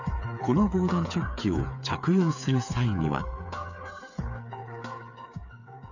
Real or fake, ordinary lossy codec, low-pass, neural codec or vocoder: fake; none; 7.2 kHz; codec, 16 kHz, 8 kbps, FreqCodec, smaller model